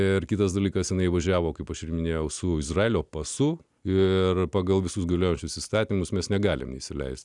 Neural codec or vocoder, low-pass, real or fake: none; 10.8 kHz; real